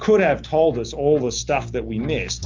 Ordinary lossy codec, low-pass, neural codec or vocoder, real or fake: MP3, 64 kbps; 7.2 kHz; none; real